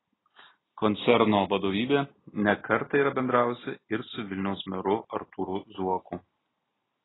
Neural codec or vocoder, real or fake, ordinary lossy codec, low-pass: none; real; AAC, 16 kbps; 7.2 kHz